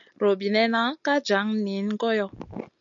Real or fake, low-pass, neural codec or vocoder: real; 7.2 kHz; none